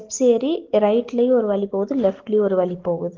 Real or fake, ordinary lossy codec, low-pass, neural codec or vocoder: real; Opus, 16 kbps; 7.2 kHz; none